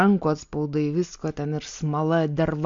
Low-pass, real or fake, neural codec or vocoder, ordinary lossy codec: 7.2 kHz; real; none; MP3, 48 kbps